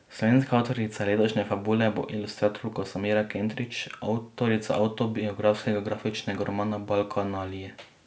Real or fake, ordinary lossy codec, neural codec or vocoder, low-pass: real; none; none; none